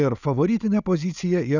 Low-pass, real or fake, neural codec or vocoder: 7.2 kHz; real; none